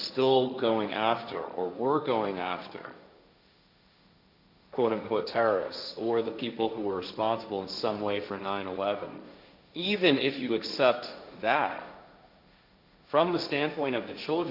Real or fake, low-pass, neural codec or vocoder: fake; 5.4 kHz; codec, 16 kHz, 1.1 kbps, Voila-Tokenizer